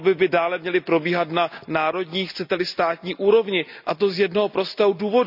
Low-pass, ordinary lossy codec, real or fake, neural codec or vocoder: 5.4 kHz; none; real; none